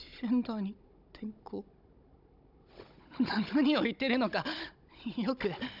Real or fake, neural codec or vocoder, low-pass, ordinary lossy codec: fake; codec, 16 kHz, 16 kbps, FunCodec, trained on Chinese and English, 50 frames a second; 5.4 kHz; none